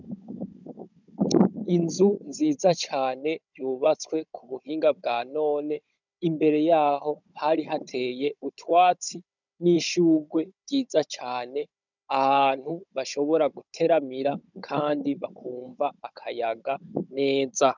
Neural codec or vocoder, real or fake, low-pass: codec, 16 kHz, 16 kbps, FunCodec, trained on Chinese and English, 50 frames a second; fake; 7.2 kHz